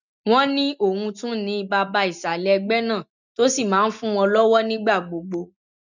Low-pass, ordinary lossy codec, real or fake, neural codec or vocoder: 7.2 kHz; none; real; none